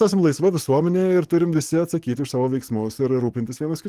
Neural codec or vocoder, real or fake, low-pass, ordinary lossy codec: codec, 44.1 kHz, 7.8 kbps, DAC; fake; 14.4 kHz; Opus, 24 kbps